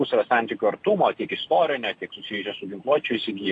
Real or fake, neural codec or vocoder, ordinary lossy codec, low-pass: real; none; AAC, 48 kbps; 10.8 kHz